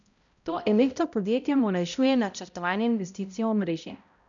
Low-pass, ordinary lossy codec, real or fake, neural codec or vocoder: 7.2 kHz; none; fake; codec, 16 kHz, 0.5 kbps, X-Codec, HuBERT features, trained on balanced general audio